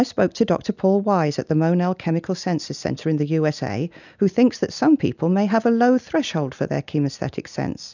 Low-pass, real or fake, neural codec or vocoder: 7.2 kHz; real; none